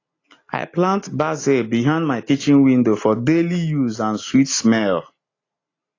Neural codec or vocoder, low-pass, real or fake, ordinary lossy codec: none; 7.2 kHz; real; AAC, 32 kbps